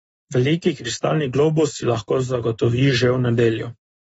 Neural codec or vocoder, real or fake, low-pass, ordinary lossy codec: none; real; 14.4 kHz; AAC, 24 kbps